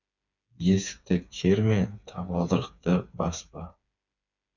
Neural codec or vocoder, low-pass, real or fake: codec, 16 kHz, 4 kbps, FreqCodec, smaller model; 7.2 kHz; fake